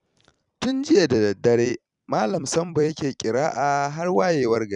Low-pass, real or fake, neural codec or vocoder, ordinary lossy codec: 10.8 kHz; fake; vocoder, 44.1 kHz, 128 mel bands every 256 samples, BigVGAN v2; none